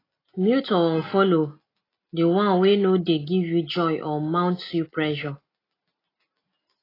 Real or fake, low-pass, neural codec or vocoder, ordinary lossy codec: real; 5.4 kHz; none; AAC, 24 kbps